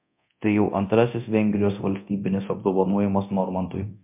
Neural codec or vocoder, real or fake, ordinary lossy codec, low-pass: codec, 24 kHz, 0.9 kbps, DualCodec; fake; MP3, 32 kbps; 3.6 kHz